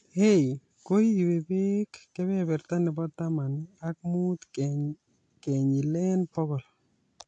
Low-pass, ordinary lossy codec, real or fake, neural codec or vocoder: 9.9 kHz; AAC, 48 kbps; real; none